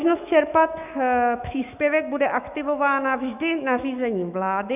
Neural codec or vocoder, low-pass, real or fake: autoencoder, 48 kHz, 128 numbers a frame, DAC-VAE, trained on Japanese speech; 3.6 kHz; fake